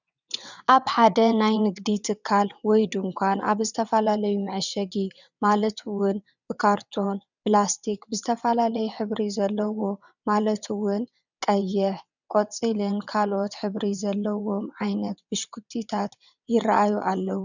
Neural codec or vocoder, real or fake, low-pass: vocoder, 22.05 kHz, 80 mel bands, WaveNeXt; fake; 7.2 kHz